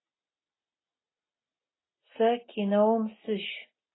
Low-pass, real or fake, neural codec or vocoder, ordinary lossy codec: 7.2 kHz; real; none; AAC, 16 kbps